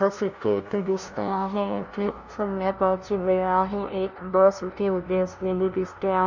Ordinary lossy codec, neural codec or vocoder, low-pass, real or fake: none; codec, 16 kHz, 0.5 kbps, FunCodec, trained on LibriTTS, 25 frames a second; 7.2 kHz; fake